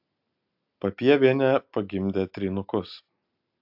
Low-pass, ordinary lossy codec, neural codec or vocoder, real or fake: 5.4 kHz; AAC, 48 kbps; none; real